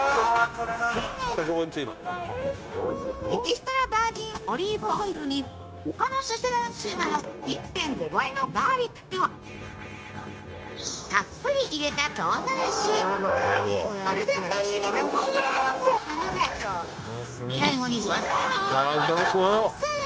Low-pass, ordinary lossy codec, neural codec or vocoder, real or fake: none; none; codec, 16 kHz, 0.9 kbps, LongCat-Audio-Codec; fake